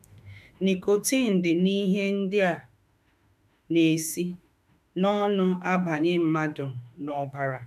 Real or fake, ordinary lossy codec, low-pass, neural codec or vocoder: fake; none; 14.4 kHz; autoencoder, 48 kHz, 32 numbers a frame, DAC-VAE, trained on Japanese speech